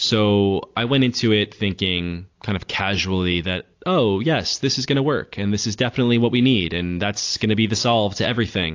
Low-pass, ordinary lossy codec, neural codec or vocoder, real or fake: 7.2 kHz; AAC, 48 kbps; none; real